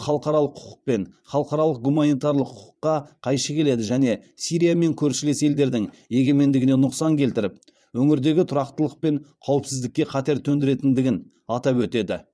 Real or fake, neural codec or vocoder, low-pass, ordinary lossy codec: fake; vocoder, 22.05 kHz, 80 mel bands, Vocos; none; none